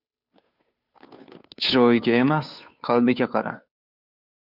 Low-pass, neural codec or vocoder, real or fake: 5.4 kHz; codec, 16 kHz, 2 kbps, FunCodec, trained on Chinese and English, 25 frames a second; fake